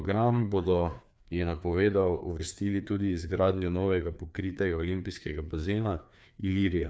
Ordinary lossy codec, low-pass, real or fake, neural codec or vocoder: none; none; fake; codec, 16 kHz, 2 kbps, FreqCodec, larger model